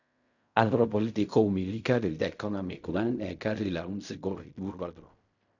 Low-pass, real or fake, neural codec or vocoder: 7.2 kHz; fake; codec, 16 kHz in and 24 kHz out, 0.4 kbps, LongCat-Audio-Codec, fine tuned four codebook decoder